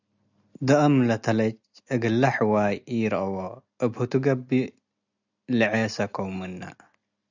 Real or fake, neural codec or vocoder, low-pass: real; none; 7.2 kHz